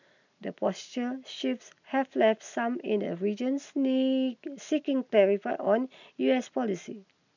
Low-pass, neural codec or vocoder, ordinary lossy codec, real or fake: 7.2 kHz; none; none; real